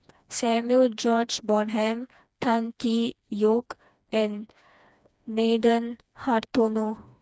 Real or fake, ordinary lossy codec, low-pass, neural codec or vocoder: fake; none; none; codec, 16 kHz, 2 kbps, FreqCodec, smaller model